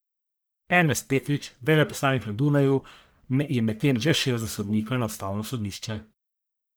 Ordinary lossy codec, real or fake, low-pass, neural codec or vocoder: none; fake; none; codec, 44.1 kHz, 1.7 kbps, Pupu-Codec